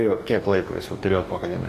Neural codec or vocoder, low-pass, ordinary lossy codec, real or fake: codec, 44.1 kHz, 2.6 kbps, DAC; 14.4 kHz; AAC, 48 kbps; fake